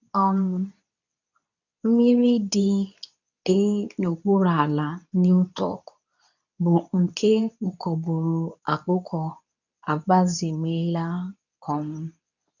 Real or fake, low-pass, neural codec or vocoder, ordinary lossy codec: fake; 7.2 kHz; codec, 24 kHz, 0.9 kbps, WavTokenizer, medium speech release version 2; none